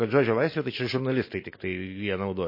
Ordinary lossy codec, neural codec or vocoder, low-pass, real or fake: MP3, 24 kbps; none; 5.4 kHz; real